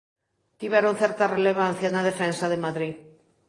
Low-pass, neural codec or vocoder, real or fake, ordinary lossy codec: 10.8 kHz; vocoder, 44.1 kHz, 128 mel bands every 512 samples, BigVGAN v2; fake; AAC, 32 kbps